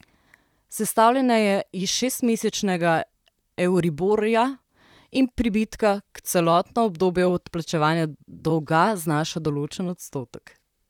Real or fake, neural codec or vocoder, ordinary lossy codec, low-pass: fake; vocoder, 44.1 kHz, 128 mel bands, Pupu-Vocoder; none; 19.8 kHz